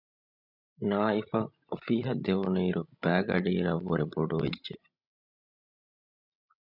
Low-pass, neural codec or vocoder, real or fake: 5.4 kHz; codec, 16 kHz, 16 kbps, FreqCodec, larger model; fake